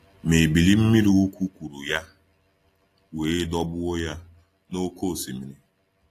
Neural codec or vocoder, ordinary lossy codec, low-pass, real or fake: none; AAC, 48 kbps; 14.4 kHz; real